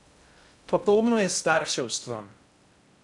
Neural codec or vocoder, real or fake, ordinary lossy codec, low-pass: codec, 16 kHz in and 24 kHz out, 0.6 kbps, FocalCodec, streaming, 2048 codes; fake; none; 10.8 kHz